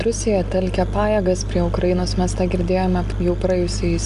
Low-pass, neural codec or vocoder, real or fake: 10.8 kHz; none; real